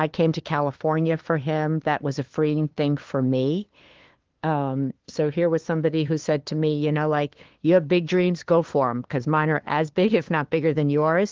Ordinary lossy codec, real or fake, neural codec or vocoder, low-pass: Opus, 16 kbps; fake; codec, 16 kHz, 2 kbps, FunCodec, trained on LibriTTS, 25 frames a second; 7.2 kHz